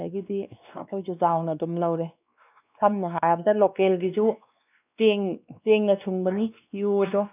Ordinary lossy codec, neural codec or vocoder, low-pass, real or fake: none; codec, 16 kHz, 2 kbps, X-Codec, WavLM features, trained on Multilingual LibriSpeech; 3.6 kHz; fake